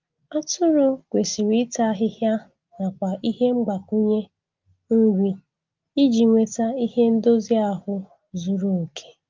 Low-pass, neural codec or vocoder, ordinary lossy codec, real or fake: 7.2 kHz; none; Opus, 32 kbps; real